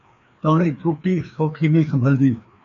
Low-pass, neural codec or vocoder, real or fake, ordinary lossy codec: 7.2 kHz; codec, 16 kHz, 2 kbps, FreqCodec, larger model; fake; MP3, 96 kbps